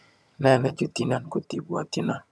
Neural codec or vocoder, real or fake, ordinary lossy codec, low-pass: vocoder, 22.05 kHz, 80 mel bands, HiFi-GAN; fake; none; none